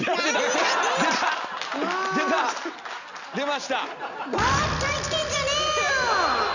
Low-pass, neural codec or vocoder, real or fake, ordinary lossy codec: 7.2 kHz; none; real; none